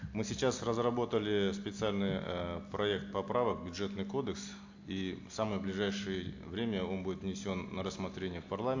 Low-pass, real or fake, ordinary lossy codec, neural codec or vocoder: 7.2 kHz; real; AAC, 48 kbps; none